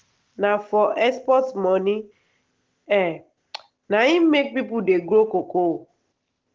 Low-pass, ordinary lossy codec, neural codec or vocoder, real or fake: 7.2 kHz; Opus, 16 kbps; none; real